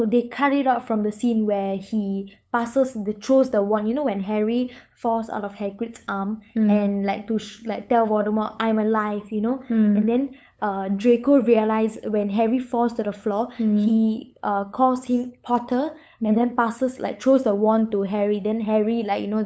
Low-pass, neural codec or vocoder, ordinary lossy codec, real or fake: none; codec, 16 kHz, 8 kbps, FunCodec, trained on LibriTTS, 25 frames a second; none; fake